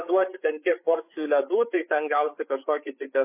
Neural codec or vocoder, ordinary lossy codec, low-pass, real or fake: codec, 16 kHz, 8 kbps, FreqCodec, larger model; MP3, 24 kbps; 3.6 kHz; fake